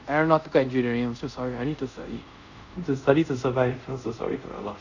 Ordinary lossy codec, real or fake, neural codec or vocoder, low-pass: none; fake; codec, 24 kHz, 0.5 kbps, DualCodec; 7.2 kHz